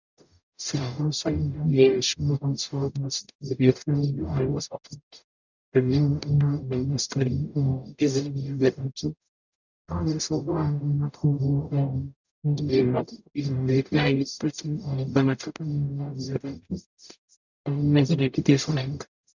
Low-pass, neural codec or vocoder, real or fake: 7.2 kHz; codec, 44.1 kHz, 0.9 kbps, DAC; fake